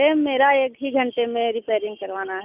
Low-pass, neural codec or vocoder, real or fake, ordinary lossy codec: 3.6 kHz; none; real; none